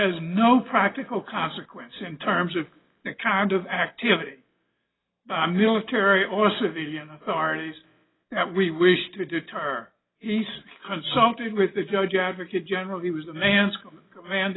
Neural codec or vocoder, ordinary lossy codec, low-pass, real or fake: none; AAC, 16 kbps; 7.2 kHz; real